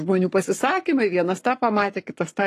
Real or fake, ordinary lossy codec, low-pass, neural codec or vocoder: fake; AAC, 48 kbps; 14.4 kHz; codec, 44.1 kHz, 7.8 kbps, Pupu-Codec